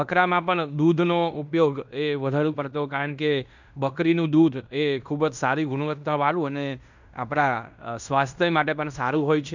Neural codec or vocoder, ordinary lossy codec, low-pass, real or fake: codec, 16 kHz in and 24 kHz out, 0.9 kbps, LongCat-Audio-Codec, fine tuned four codebook decoder; none; 7.2 kHz; fake